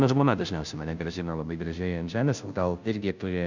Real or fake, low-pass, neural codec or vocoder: fake; 7.2 kHz; codec, 16 kHz, 0.5 kbps, FunCodec, trained on Chinese and English, 25 frames a second